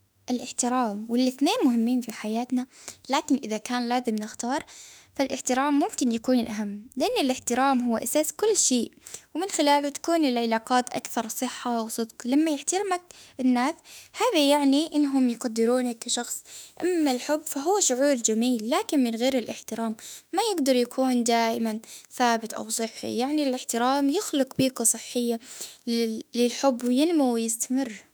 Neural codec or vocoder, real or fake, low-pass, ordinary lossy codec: autoencoder, 48 kHz, 32 numbers a frame, DAC-VAE, trained on Japanese speech; fake; none; none